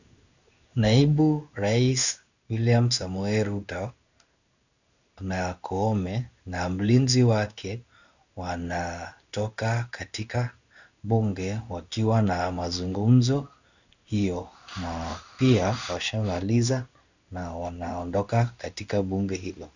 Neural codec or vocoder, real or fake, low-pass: codec, 16 kHz in and 24 kHz out, 1 kbps, XY-Tokenizer; fake; 7.2 kHz